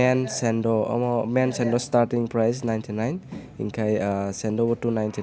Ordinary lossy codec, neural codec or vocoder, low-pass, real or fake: none; none; none; real